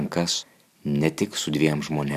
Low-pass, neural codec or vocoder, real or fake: 14.4 kHz; none; real